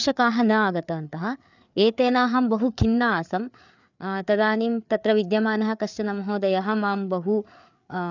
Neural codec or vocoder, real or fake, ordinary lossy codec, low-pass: codec, 16 kHz, 4 kbps, FreqCodec, larger model; fake; none; 7.2 kHz